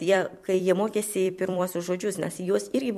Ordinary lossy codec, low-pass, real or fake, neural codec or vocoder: MP3, 64 kbps; 14.4 kHz; fake; vocoder, 44.1 kHz, 128 mel bands every 256 samples, BigVGAN v2